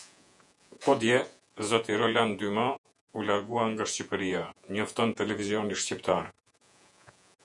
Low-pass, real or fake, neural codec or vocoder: 10.8 kHz; fake; vocoder, 48 kHz, 128 mel bands, Vocos